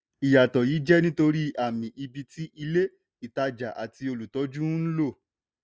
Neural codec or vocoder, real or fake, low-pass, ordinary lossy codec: none; real; none; none